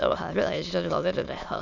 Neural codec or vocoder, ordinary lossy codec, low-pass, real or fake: autoencoder, 22.05 kHz, a latent of 192 numbers a frame, VITS, trained on many speakers; none; 7.2 kHz; fake